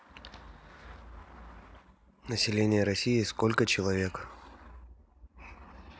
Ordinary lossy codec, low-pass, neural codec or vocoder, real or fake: none; none; none; real